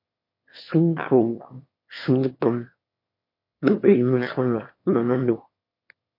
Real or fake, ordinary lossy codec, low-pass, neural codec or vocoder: fake; MP3, 32 kbps; 5.4 kHz; autoencoder, 22.05 kHz, a latent of 192 numbers a frame, VITS, trained on one speaker